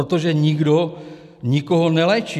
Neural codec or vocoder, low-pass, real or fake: none; 14.4 kHz; real